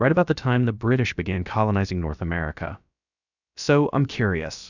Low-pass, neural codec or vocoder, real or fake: 7.2 kHz; codec, 16 kHz, about 1 kbps, DyCAST, with the encoder's durations; fake